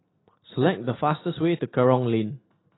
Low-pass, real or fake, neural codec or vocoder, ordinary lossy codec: 7.2 kHz; real; none; AAC, 16 kbps